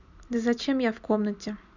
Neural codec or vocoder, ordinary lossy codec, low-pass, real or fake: none; none; 7.2 kHz; real